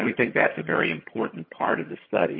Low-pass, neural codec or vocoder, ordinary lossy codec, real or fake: 5.4 kHz; vocoder, 22.05 kHz, 80 mel bands, HiFi-GAN; MP3, 24 kbps; fake